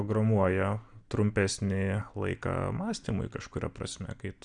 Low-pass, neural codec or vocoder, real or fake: 9.9 kHz; none; real